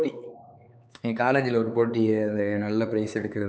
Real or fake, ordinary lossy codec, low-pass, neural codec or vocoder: fake; none; none; codec, 16 kHz, 4 kbps, X-Codec, HuBERT features, trained on LibriSpeech